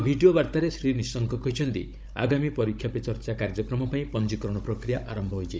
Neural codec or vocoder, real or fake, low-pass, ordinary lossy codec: codec, 16 kHz, 16 kbps, FunCodec, trained on Chinese and English, 50 frames a second; fake; none; none